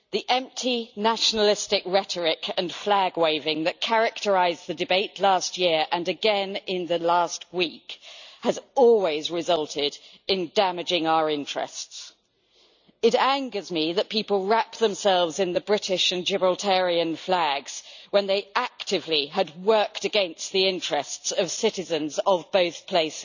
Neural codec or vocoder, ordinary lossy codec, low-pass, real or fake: none; none; 7.2 kHz; real